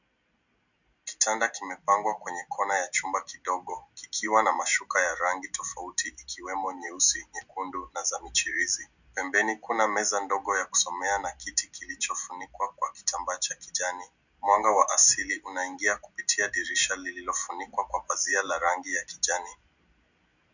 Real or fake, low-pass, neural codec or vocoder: real; 7.2 kHz; none